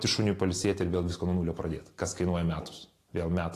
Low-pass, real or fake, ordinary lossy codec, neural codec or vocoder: 14.4 kHz; real; AAC, 48 kbps; none